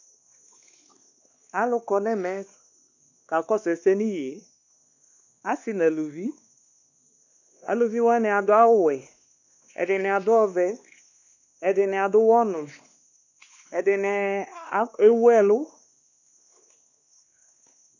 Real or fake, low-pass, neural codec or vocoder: fake; 7.2 kHz; codec, 16 kHz, 2 kbps, X-Codec, WavLM features, trained on Multilingual LibriSpeech